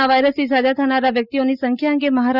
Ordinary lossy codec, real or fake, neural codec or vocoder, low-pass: Opus, 64 kbps; real; none; 5.4 kHz